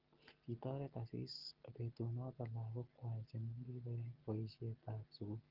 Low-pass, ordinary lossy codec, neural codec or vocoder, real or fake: 5.4 kHz; Opus, 16 kbps; none; real